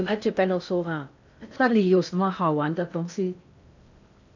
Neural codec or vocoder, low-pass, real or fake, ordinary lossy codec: codec, 16 kHz in and 24 kHz out, 0.6 kbps, FocalCodec, streaming, 4096 codes; 7.2 kHz; fake; none